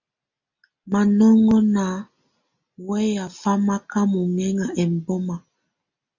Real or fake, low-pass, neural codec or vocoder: real; 7.2 kHz; none